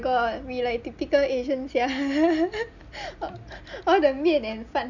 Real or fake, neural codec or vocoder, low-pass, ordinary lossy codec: real; none; 7.2 kHz; none